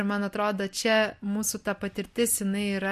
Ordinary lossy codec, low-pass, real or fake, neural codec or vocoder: MP3, 64 kbps; 14.4 kHz; real; none